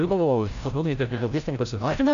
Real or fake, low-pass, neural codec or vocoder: fake; 7.2 kHz; codec, 16 kHz, 0.5 kbps, FreqCodec, larger model